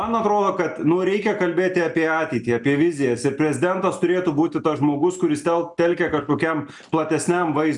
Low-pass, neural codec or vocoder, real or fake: 10.8 kHz; none; real